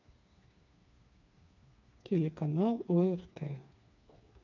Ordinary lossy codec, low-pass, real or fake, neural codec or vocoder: none; 7.2 kHz; fake; codec, 16 kHz, 4 kbps, FreqCodec, smaller model